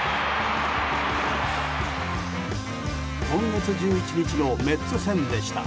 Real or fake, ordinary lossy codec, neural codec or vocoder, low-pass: real; none; none; none